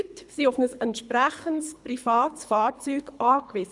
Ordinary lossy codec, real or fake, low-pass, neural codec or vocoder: none; fake; 10.8 kHz; codec, 24 kHz, 3 kbps, HILCodec